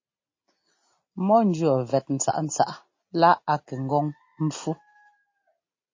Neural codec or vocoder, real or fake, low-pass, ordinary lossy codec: none; real; 7.2 kHz; MP3, 32 kbps